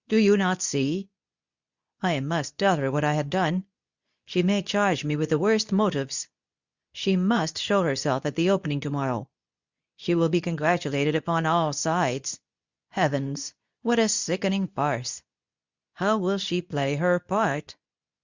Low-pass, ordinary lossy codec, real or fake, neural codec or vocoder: 7.2 kHz; Opus, 64 kbps; fake; codec, 24 kHz, 0.9 kbps, WavTokenizer, medium speech release version 2